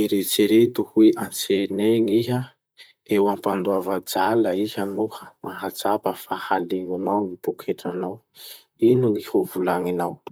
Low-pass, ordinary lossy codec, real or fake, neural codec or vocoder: none; none; fake; vocoder, 44.1 kHz, 128 mel bands, Pupu-Vocoder